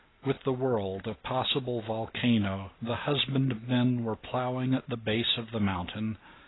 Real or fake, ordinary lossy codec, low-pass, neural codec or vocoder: real; AAC, 16 kbps; 7.2 kHz; none